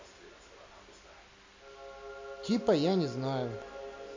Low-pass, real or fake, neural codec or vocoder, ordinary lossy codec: 7.2 kHz; real; none; MP3, 48 kbps